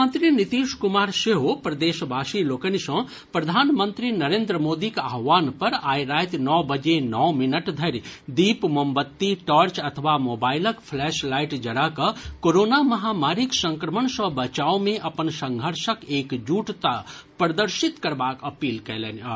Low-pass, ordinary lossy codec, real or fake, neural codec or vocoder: none; none; real; none